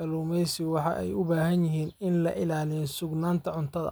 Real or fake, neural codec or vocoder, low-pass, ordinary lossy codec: real; none; none; none